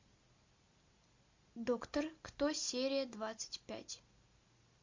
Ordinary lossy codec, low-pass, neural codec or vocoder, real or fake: MP3, 48 kbps; 7.2 kHz; none; real